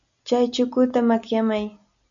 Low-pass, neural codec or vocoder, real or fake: 7.2 kHz; none; real